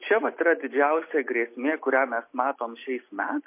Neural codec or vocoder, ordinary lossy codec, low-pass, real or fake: none; MP3, 24 kbps; 3.6 kHz; real